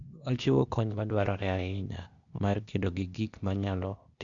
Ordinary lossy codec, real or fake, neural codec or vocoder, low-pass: Opus, 64 kbps; fake; codec, 16 kHz, 0.8 kbps, ZipCodec; 7.2 kHz